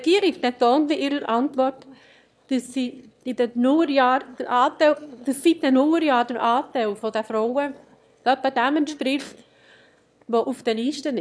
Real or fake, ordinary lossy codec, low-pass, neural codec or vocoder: fake; none; none; autoencoder, 22.05 kHz, a latent of 192 numbers a frame, VITS, trained on one speaker